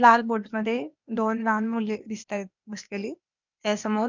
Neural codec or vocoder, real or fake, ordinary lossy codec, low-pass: codec, 16 kHz, 0.8 kbps, ZipCodec; fake; none; 7.2 kHz